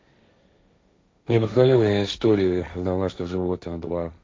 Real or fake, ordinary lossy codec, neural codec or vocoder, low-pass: fake; none; codec, 16 kHz, 1.1 kbps, Voila-Tokenizer; none